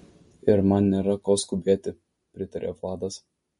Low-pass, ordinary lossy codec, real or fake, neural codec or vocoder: 14.4 kHz; MP3, 48 kbps; real; none